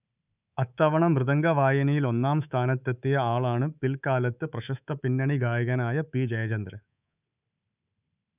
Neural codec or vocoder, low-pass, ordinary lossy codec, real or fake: codec, 24 kHz, 3.1 kbps, DualCodec; 3.6 kHz; none; fake